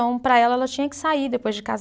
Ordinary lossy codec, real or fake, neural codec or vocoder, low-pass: none; real; none; none